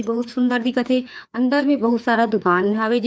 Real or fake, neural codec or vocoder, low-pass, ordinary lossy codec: fake; codec, 16 kHz, 2 kbps, FreqCodec, larger model; none; none